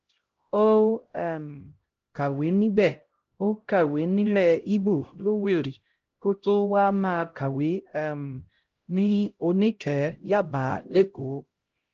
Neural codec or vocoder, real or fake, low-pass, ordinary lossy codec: codec, 16 kHz, 0.5 kbps, X-Codec, HuBERT features, trained on LibriSpeech; fake; 7.2 kHz; Opus, 16 kbps